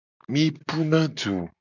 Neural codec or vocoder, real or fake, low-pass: none; real; 7.2 kHz